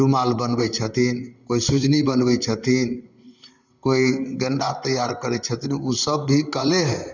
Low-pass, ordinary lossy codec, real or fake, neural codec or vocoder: 7.2 kHz; none; fake; vocoder, 44.1 kHz, 128 mel bands, Pupu-Vocoder